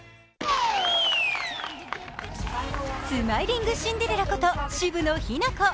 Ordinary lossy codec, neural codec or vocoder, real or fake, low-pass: none; none; real; none